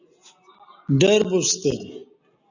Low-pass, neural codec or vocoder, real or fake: 7.2 kHz; none; real